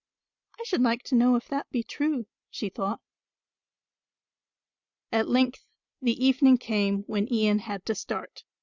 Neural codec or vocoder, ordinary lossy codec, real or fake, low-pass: none; Opus, 64 kbps; real; 7.2 kHz